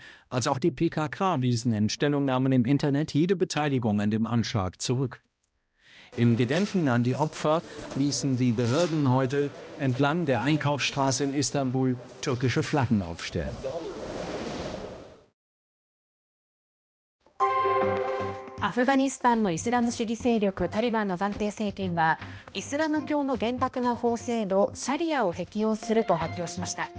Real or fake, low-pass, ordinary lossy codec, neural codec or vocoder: fake; none; none; codec, 16 kHz, 1 kbps, X-Codec, HuBERT features, trained on balanced general audio